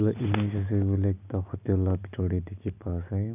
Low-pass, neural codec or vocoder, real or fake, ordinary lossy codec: 3.6 kHz; none; real; none